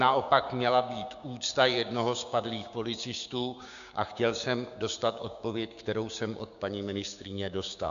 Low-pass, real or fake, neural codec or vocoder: 7.2 kHz; fake; codec, 16 kHz, 6 kbps, DAC